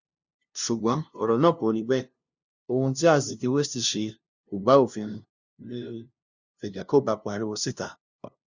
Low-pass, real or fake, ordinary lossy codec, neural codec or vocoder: 7.2 kHz; fake; Opus, 64 kbps; codec, 16 kHz, 0.5 kbps, FunCodec, trained on LibriTTS, 25 frames a second